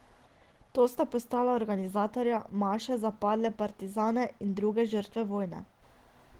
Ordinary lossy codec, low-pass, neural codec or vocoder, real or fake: Opus, 16 kbps; 19.8 kHz; none; real